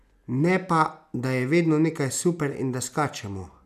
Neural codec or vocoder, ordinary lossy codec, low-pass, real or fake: none; none; 14.4 kHz; real